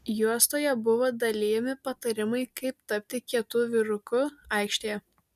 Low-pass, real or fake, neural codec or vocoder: 14.4 kHz; real; none